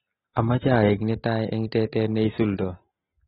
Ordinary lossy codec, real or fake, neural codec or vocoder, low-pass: AAC, 16 kbps; real; none; 14.4 kHz